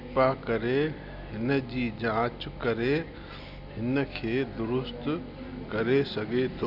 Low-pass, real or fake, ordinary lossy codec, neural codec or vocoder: 5.4 kHz; real; none; none